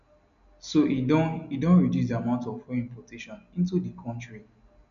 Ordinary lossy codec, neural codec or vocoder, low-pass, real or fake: none; none; 7.2 kHz; real